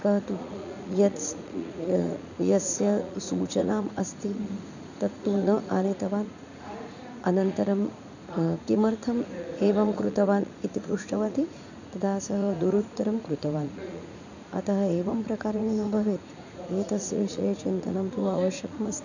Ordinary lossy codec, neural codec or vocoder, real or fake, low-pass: none; vocoder, 44.1 kHz, 80 mel bands, Vocos; fake; 7.2 kHz